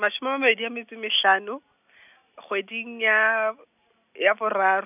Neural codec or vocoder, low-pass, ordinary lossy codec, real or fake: none; 3.6 kHz; none; real